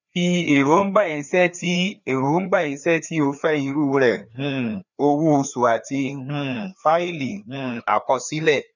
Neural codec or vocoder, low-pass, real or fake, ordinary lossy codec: codec, 16 kHz, 2 kbps, FreqCodec, larger model; 7.2 kHz; fake; none